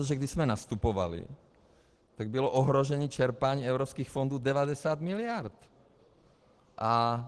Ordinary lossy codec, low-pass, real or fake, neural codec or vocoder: Opus, 16 kbps; 10.8 kHz; real; none